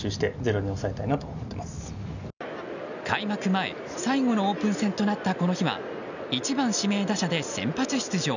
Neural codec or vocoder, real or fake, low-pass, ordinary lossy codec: none; real; 7.2 kHz; none